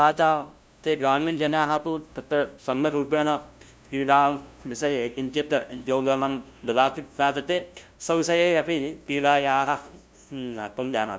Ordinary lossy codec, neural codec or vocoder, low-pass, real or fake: none; codec, 16 kHz, 0.5 kbps, FunCodec, trained on LibriTTS, 25 frames a second; none; fake